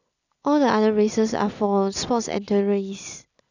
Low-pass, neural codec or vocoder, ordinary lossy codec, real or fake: 7.2 kHz; none; none; real